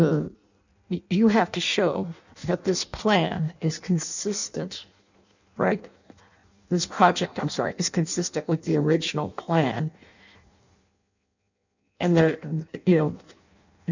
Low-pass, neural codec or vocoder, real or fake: 7.2 kHz; codec, 16 kHz in and 24 kHz out, 0.6 kbps, FireRedTTS-2 codec; fake